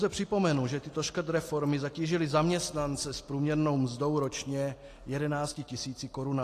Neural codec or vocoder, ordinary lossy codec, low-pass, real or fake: none; AAC, 48 kbps; 14.4 kHz; real